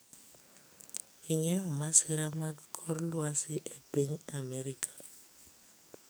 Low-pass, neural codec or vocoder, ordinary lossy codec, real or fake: none; codec, 44.1 kHz, 2.6 kbps, SNAC; none; fake